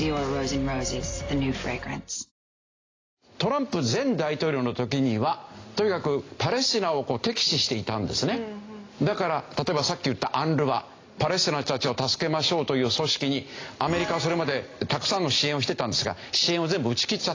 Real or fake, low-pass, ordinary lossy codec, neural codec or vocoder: real; 7.2 kHz; AAC, 32 kbps; none